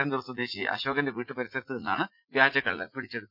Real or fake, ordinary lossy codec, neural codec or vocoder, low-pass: fake; none; vocoder, 44.1 kHz, 80 mel bands, Vocos; 5.4 kHz